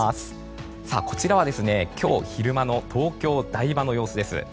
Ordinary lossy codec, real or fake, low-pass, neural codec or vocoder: none; real; none; none